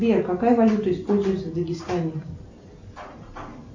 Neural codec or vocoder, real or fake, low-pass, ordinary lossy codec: none; real; 7.2 kHz; MP3, 48 kbps